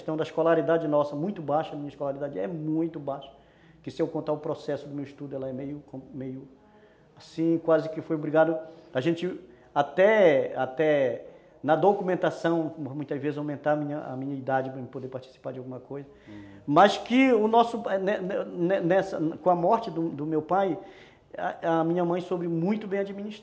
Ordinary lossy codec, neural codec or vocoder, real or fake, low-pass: none; none; real; none